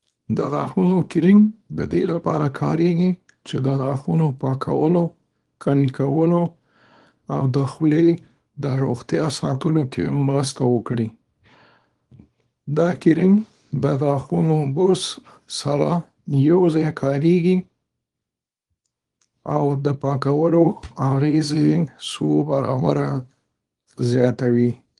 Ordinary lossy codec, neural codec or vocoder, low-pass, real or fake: Opus, 24 kbps; codec, 24 kHz, 0.9 kbps, WavTokenizer, small release; 10.8 kHz; fake